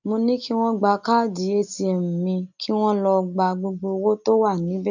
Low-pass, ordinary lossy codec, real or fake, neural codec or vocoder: 7.2 kHz; none; real; none